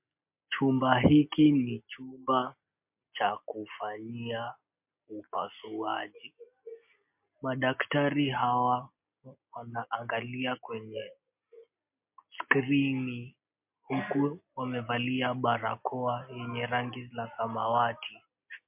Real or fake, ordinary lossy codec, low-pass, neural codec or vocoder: real; MP3, 32 kbps; 3.6 kHz; none